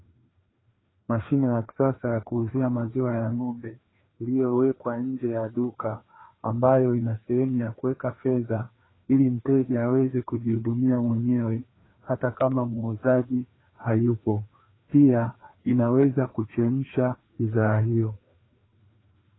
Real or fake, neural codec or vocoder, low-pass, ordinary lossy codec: fake; codec, 16 kHz, 2 kbps, FreqCodec, larger model; 7.2 kHz; AAC, 16 kbps